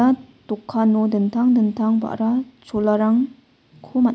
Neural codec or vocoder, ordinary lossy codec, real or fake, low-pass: none; none; real; none